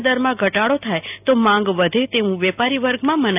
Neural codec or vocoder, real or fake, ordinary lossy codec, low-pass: none; real; AAC, 32 kbps; 3.6 kHz